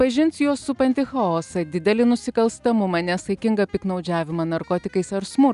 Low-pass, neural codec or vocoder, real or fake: 10.8 kHz; none; real